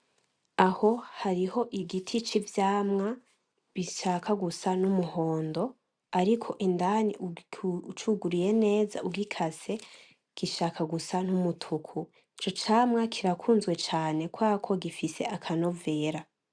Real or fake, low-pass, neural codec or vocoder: real; 9.9 kHz; none